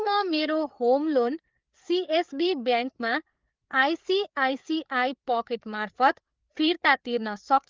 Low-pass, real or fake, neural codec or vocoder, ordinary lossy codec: 7.2 kHz; fake; codec, 16 kHz, 4 kbps, FreqCodec, larger model; Opus, 32 kbps